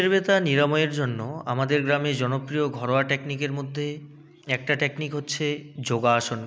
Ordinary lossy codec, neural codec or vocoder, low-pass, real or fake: none; none; none; real